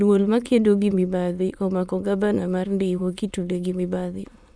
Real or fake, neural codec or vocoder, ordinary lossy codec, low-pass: fake; autoencoder, 22.05 kHz, a latent of 192 numbers a frame, VITS, trained on many speakers; none; none